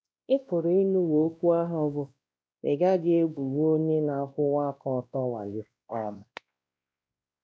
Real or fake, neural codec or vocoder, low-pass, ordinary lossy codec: fake; codec, 16 kHz, 1 kbps, X-Codec, WavLM features, trained on Multilingual LibriSpeech; none; none